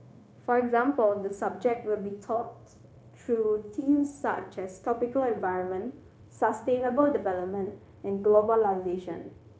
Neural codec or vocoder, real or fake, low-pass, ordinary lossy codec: codec, 16 kHz, 0.9 kbps, LongCat-Audio-Codec; fake; none; none